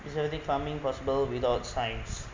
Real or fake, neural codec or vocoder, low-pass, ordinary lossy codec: real; none; 7.2 kHz; MP3, 64 kbps